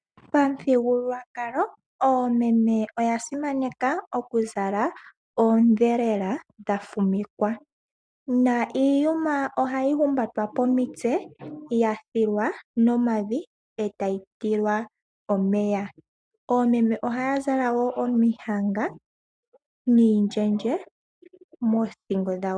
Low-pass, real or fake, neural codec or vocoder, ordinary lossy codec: 9.9 kHz; real; none; MP3, 96 kbps